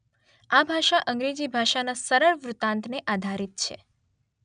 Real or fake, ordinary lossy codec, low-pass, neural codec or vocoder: real; none; 9.9 kHz; none